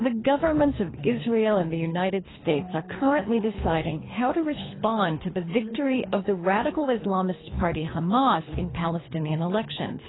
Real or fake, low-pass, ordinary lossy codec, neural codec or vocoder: fake; 7.2 kHz; AAC, 16 kbps; codec, 24 kHz, 3 kbps, HILCodec